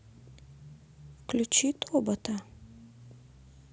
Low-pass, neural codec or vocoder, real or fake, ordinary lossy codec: none; none; real; none